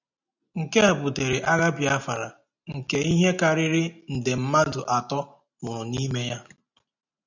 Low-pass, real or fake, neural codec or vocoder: 7.2 kHz; real; none